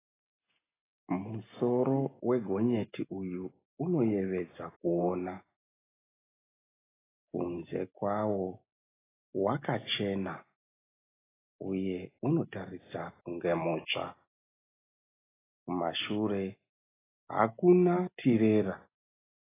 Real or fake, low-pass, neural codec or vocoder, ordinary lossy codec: real; 3.6 kHz; none; AAC, 16 kbps